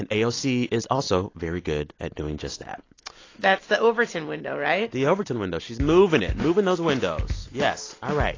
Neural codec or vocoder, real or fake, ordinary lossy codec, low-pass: none; real; AAC, 32 kbps; 7.2 kHz